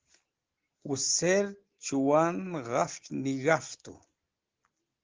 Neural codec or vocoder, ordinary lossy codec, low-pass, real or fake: none; Opus, 16 kbps; 7.2 kHz; real